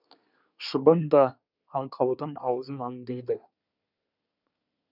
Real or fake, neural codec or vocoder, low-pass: fake; codec, 24 kHz, 1 kbps, SNAC; 5.4 kHz